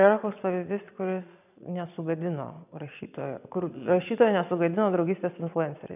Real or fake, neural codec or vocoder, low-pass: fake; vocoder, 22.05 kHz, 80 mel bands, Vocos; 3.6 kHz